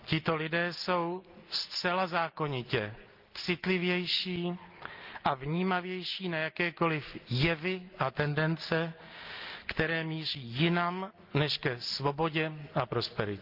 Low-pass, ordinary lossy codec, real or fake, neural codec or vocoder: 5.4 kHz; Opus, 24 kbps; real; none